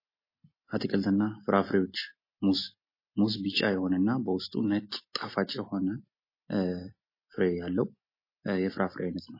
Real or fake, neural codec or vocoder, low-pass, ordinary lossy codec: real; none; 5.4 kHz; MP3, 24 kbps